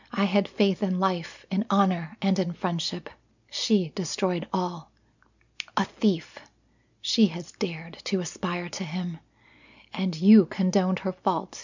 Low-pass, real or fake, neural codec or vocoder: 7.2 kHz; real; none